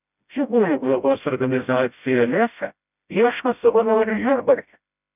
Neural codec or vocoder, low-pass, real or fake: codec, 16 kHz, 0.5 kbps, FreqCodec, smaller model; 3.6 kHz; fake